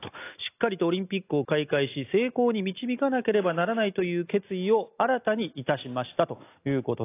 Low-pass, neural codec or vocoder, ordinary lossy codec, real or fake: 3.6 kHz; none; AAC, 24 kbps; real